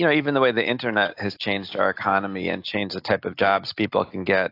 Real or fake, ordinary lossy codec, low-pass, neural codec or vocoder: real; AAC, 32 kbps; 5.4 kHz; none